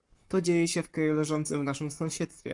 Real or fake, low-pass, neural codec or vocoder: fake; 10.8 kHz; codec, 44.1 kHz, 7.8 kbps, Pupu-Codec